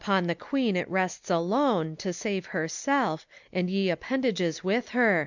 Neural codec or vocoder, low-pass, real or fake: none; 7.2 kHz; real